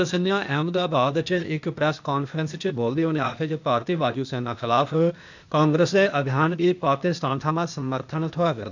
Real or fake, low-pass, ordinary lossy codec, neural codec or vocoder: fake; 7.2 kHz; none; codec, 16 kHz, 0.8 kbps, ZipCodec